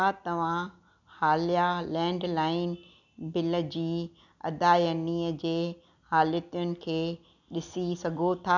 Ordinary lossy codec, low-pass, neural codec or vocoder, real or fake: none; 7.2 kHz; none; real